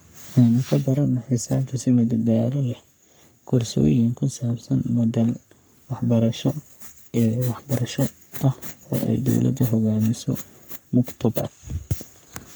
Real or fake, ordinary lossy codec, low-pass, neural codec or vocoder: fake; none; none; codec, 44.1 kHz, 3.4 kbps, Pupu-Codec